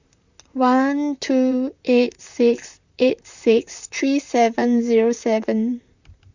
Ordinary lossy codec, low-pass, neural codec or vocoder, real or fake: Opus, 64 kbps; 7.2 kHz; vocoder, 44.1 kHz, 128 mel bands, Pupu-Vocoder; fake